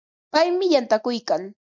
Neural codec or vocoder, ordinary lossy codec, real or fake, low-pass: none; MP3, 64 kbps; real; 7.2 kHz